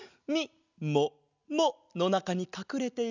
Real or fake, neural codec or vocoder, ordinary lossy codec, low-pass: real; none; none; 7.2 kHz